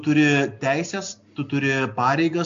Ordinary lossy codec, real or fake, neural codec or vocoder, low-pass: AAC, 64 kbps; real; none; 7.2 kHz